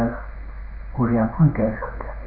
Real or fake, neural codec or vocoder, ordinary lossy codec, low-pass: fake; codec, 16 kHz in and 24 kHz out, 1 kbps, XY-Tokenizer; none; 5.4 kHz